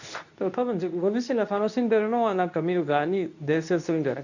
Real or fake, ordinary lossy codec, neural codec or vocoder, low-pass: fake; none; codec, 24 kHz, 0.9 kbps, WavTokenizer, medium speech release version 2; 7.2 kHz